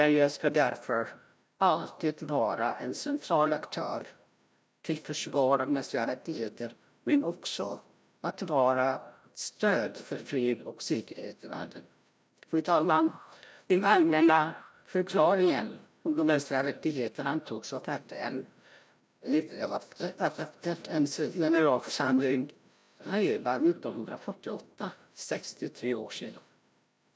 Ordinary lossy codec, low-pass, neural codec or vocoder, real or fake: none; none; codec, 16 kHz, 0.5 kbps, FreqCodec, larger model; fake